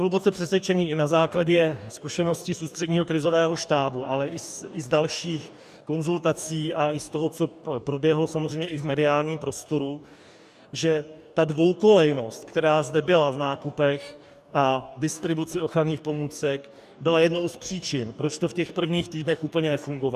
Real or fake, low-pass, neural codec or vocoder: fake; 14.4 kHz; codec, 44.1 kHz, 2.6 kbps, DAC